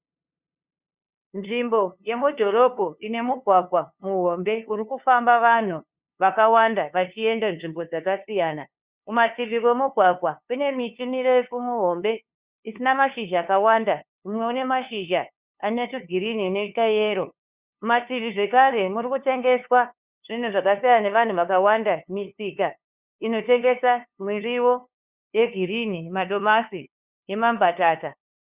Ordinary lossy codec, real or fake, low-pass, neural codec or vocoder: Opus, 64 kbps; fake; 3.6 kHz; codec, 16 kHz, 2 kbps, FunCodec, trained on LibriTTS, 25 frames a second